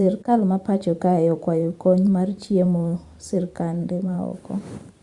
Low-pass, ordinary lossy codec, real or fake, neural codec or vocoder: 10.8 kHz; Opus, 64 kbps; fake; vocoder, 44.1 kHz, 128 mel bands every 512 samples, BigVGAN v2